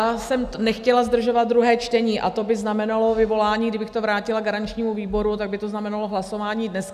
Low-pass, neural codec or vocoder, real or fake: 14.4 kHz; none; real